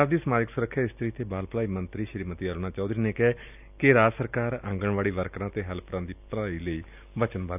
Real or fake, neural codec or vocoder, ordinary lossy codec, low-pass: real; none; none; 3.6 kHz